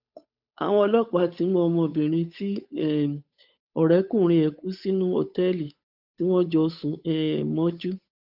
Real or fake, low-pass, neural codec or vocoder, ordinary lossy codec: fake; 5.4 kHz; codec, 16 kHz, 8 kbps, FunCodec, trained on Chinese and English, 25 frames a second; none